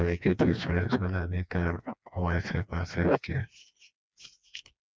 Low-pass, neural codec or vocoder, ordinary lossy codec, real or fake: none; codec, 16 kHz, 2 kbps, FreqCodec, smaller model; none; fake